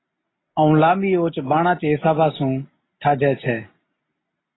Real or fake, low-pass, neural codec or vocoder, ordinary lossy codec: real; 7.2 kHz; none; AAC, 16 kbps